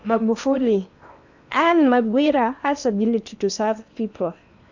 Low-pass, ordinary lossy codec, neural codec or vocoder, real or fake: 7.2 kHz; none; codec, 16 kHz in and 24 kHz out, 0.8 kbps, FocalCodec, streaming, 65536 codes; fake